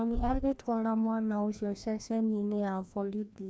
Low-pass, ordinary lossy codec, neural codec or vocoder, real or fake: none; none; codec, 16 kHz, 1 kbps, FreqCodec, larger model; fake